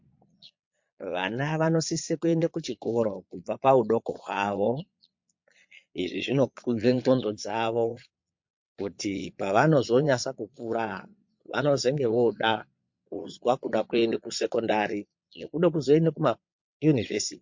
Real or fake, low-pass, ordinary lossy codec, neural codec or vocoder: fake; 7.2 kHz; MP3, 48 kbps; vocoder, 22.05 kHz, 80 mel bands, Vocos